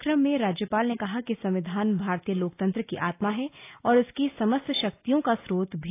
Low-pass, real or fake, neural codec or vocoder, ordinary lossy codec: 3.6 kHz; real; none; AAC, 24 kbps